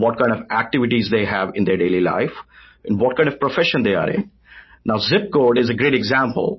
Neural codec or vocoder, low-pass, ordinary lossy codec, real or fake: none; 7.2 kHz; MP3, 24 kbps; real